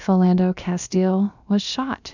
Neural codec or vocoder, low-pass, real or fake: codec, 16 kHz, about 1 kbps, DyCAST, with the encoder's durations; 7.2 kHz; fake